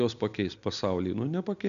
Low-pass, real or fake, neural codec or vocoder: 7.2 kHz; real; none